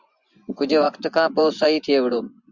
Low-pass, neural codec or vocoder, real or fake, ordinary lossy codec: 7.2 kHz; vocoder, 24 kHz, 100 mel bands, Vocos; fake; Opus, 64 kbps